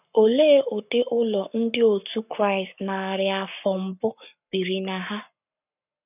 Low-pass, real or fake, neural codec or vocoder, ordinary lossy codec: 3.6 kHz; fake; codec, 44.1 kHz, 7.8 kbps, Pupu-Codec; none